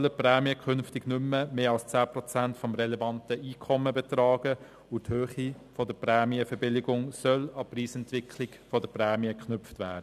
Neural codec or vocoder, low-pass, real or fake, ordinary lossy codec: none; 14.4 kHz; real; none